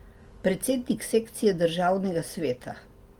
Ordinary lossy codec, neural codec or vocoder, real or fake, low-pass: Opus, 24 kbps; none; real; 19.8 kHz